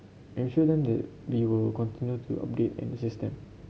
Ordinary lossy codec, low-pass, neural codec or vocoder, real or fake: none; none; none; real